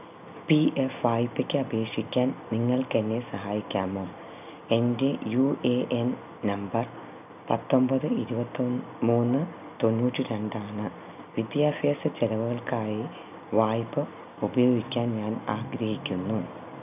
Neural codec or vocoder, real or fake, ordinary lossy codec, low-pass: none; real; none; 3.6 kHz